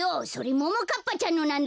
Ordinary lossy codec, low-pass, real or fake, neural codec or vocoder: none; none; real; none